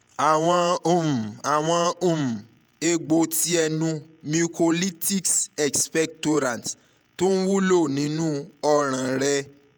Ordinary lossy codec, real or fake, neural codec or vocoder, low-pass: none; fake; vocoder, 48 kHz, 128 mel bands, Vocos; none